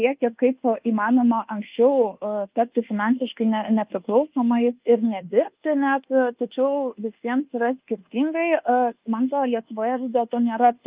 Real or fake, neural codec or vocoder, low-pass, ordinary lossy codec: fake; codec, 24 kHz, 1.2 kbps, DualCodec; 3.6 kHz; Opus, 24 kbps